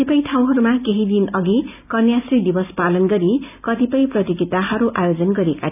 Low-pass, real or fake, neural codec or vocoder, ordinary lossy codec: 3.6 kHz; real; none; none